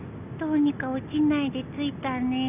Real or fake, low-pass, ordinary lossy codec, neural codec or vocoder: real; 3.6 kHz; none; none